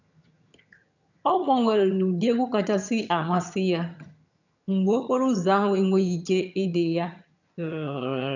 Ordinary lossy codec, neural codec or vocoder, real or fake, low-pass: none; vocoder, 22.05 kHz, 80 mel bands, HiFi-GAN; fake; 7.2 kHz